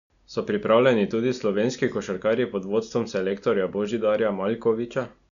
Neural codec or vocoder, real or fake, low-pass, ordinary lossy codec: none; real; 7.2 kHz; none